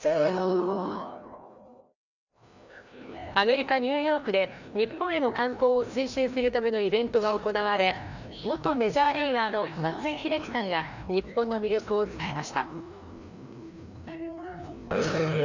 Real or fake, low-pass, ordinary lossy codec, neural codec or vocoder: fake; 7.2 kHz; none; codec, 16 kHz, 1 kbps, FreqCodec, larger model